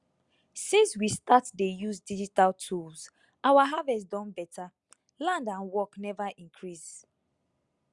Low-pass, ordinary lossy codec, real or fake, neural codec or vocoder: 10.8 kHz; Opus, 64 kbps; real; none